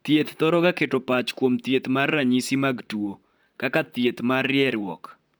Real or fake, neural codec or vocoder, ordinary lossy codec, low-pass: fake; vocoder, 44.1 kHz, 128 mel bands, Pupu-Vocoder; none; none